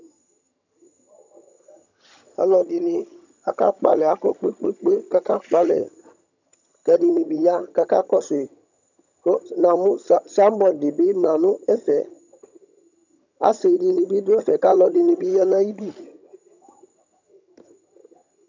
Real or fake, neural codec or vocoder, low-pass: fake; vocoder, 22.05 kHz, 80 mel bands, HiFi-GAN; 7.2 kHz